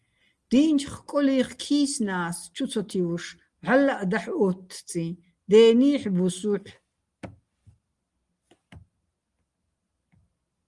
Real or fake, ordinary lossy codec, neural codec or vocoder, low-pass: real; Opus, 24 kbps; none; 10.8 kHz